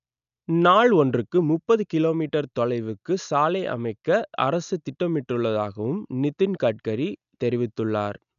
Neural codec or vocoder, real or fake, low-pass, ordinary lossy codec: none; real; 7.2 kHz; none